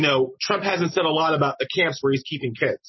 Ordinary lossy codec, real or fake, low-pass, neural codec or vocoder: MP3, 24 kbps; real; 7.2 kHz; none